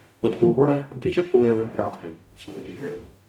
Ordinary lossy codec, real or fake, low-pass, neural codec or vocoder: none; fake; 19.8 kHz; codec, 44.1 kHz, 0.9 kbps, DAC